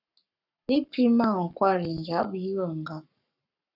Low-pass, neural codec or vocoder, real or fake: 5.4 kHz; codec, 44.1 kHz, 7.8 kbps, Pupu-Codec; fake